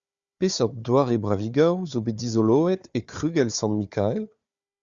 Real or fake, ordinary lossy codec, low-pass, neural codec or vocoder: fake; Opus, 64 kbps; 7.2 kHz; codec, 16 kHz, 4 kbps, FunCodec, trained on Chinese and English, 50 frames a second